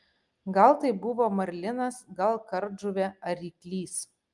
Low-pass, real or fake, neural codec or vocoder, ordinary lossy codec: 10.8 kHz; real; none; Opus, 32 kbps